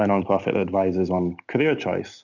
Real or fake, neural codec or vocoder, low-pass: real; none; 7.2 kHz